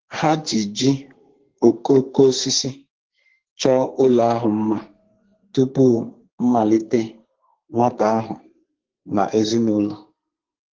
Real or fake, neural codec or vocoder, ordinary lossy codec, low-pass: fake; codec, 32 kHz, 1.9 kbps, SNAC; Opus, 16 kbps; 7.2 kHz